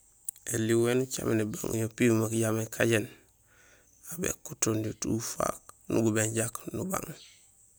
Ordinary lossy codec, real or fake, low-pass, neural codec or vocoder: none; real; none; none